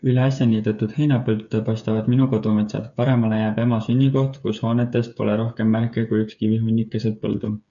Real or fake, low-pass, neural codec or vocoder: fake; 7.2 kHz; codec, 16 kHz, 8 kbps, FreqCodec, smaller model